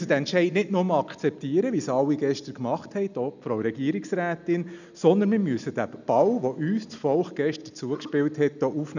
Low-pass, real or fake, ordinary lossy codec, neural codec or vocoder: 7.2 kHz; real; none; none